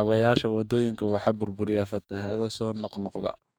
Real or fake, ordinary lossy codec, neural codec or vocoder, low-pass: fake; none; codec, 44.1 kHz, 2.6 kbps, DAC; none